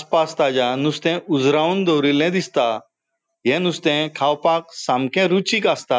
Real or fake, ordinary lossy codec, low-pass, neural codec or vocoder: real; none; none; none